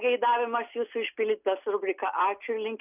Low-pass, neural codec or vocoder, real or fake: 3.6 kHz; none; real